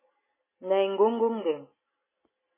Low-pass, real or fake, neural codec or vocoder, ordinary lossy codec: 3.6 kHz; real; none; MP3, 16 kbps